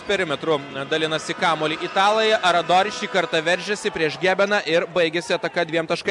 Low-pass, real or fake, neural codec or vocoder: 10.8 kHz; real; none